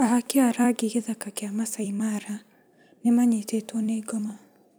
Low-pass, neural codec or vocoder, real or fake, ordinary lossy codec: none; vocoder, 44.1 kHz, 128 mel bands every 256 samples, BigVGAN v2; fake; none